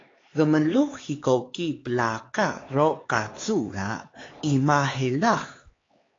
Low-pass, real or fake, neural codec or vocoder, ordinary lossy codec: 7.2 kHz; fake; codec, 16 kHz, 4 kbps, X-Codec, HuBERT features, trained on LibriSpeech; AAC, 32 kbps